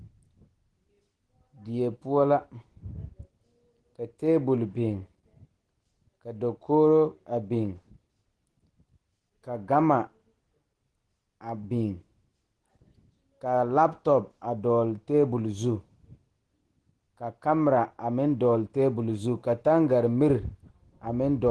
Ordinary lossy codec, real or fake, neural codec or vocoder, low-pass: Opus, 16 kbps; real; none; 10.8 kHz